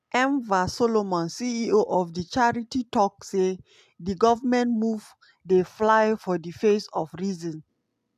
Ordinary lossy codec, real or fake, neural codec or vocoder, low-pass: AAC, 96 kbps; real; none; 14.4 kHz